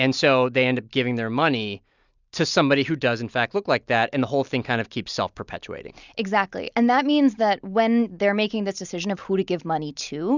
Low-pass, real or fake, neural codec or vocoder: 7.2 kHz; real; none